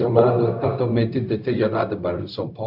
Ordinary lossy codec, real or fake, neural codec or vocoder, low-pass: none; fake; codec, 16 kHz, 0.4 kbps, LongCat-Audio-Codec; 5.4 kHz